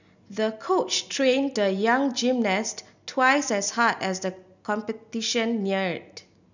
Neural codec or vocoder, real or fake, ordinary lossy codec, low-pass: none; real; none; 7.2 kHz